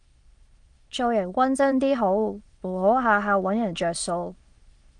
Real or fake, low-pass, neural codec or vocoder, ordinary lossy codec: fake; 9.9 kHz; autoencoder, 22.05 kHz, a latent of 192 numbers a frame, VITS, trained on many speakers; Opus, 24 kbps